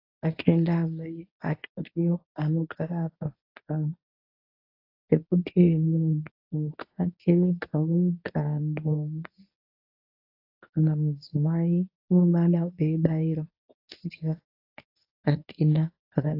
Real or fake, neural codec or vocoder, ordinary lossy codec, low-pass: fake; codec, 24 kHz, 0.9 kbps, WavTokenizer, medium speech release version 1; AAC, 32 kbps; 5.4 kHz